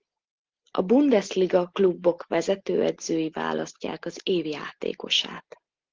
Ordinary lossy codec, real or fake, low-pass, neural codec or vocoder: Opus, 16 kbps; real; 7.2 kHz; none